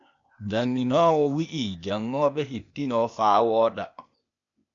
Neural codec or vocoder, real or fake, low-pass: codec, 16 kHz, 0.8 kbps, ZipCodec; fake; 7.2 kHz